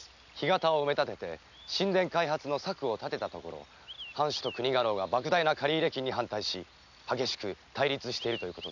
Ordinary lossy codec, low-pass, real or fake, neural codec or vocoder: none; 7.2 kHz; real; none